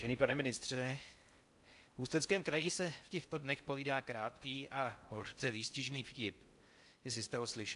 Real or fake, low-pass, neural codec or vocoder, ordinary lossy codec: fake; 10.8 kHz; codec, 16 kHz in and 24 kHz out, 0.6 kbps, FocalCodec, streaming, 4096 codes; AAC, 64 kbps